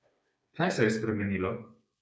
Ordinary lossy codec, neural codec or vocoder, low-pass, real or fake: none; codec, 16 kHz, 4 kbps, FreqCodec, smaller model; none; fake